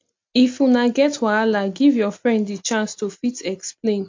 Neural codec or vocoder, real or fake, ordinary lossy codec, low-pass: none; real; MP3, 48 kbps; 7.2 kHz